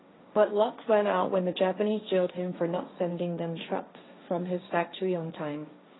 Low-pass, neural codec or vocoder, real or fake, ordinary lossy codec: 7.2 kHz; codec, 16 kHz, 1.1 kbps, Voila-Tokenizer; fake; AAC, 16 kbps